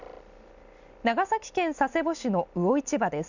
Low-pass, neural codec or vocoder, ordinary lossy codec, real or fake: 7.2 kHz; none; none; real